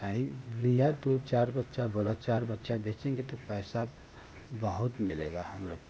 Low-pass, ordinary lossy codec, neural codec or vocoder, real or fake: none; none; codec, 16 kHz, 0.8 kbps, ZipCodec; fake